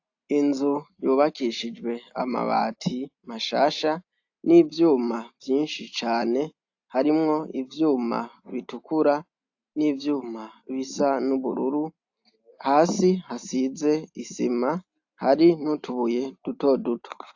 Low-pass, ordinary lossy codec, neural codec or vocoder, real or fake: 7.2 kHz; AAC, 48 kbps; none; real